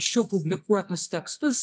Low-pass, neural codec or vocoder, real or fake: 9.9 kHz; codec, 24 kHz, 0.9 kbps, WavTokenizer, medium music audio release; fake